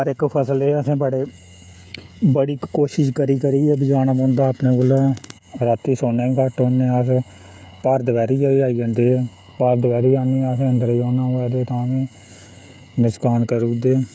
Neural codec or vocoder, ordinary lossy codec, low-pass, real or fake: codec, 16 kHz, 16 kbps, FreqCodec, smaller model; none; none; fake